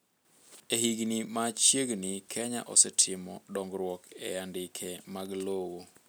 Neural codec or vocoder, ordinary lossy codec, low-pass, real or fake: none; none; none; real